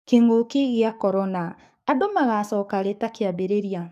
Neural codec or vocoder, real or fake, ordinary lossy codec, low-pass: codec, 44.1 kHz, 7.8 kbps, DAC; fake; none; 14.4 kHz